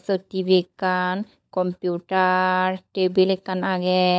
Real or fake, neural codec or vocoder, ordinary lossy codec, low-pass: fake; codec, 16 kHz, 16 kbps, FunCodec, trained on LibriTTS, 50 frames a second; none; none